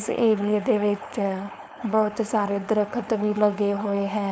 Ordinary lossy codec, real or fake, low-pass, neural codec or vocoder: none; fake; none; codec, 16 kHz, 4.8 kbps, FACodec